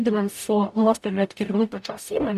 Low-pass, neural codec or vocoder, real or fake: 14.4 kHz; codec, 44.1 kHz, 0.9 kbps, DAC; fake